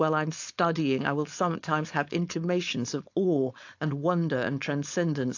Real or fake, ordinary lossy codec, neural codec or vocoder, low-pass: fake; AAC, 48 kbps; codec, 16 kHz, 4.8 kbps, FACodec; 7.2 kHz